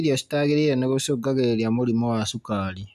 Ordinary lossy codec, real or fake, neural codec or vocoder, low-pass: none; real; none; 14.4 kHz